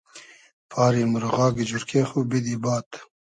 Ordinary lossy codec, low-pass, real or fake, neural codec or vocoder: MP3, 48 kbps; 10.8 kHz; real; none